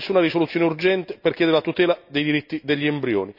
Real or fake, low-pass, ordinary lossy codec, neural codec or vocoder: real; 5.4 kHz; none; none